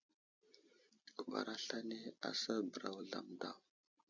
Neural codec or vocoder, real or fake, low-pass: none; real; 7.2 kHz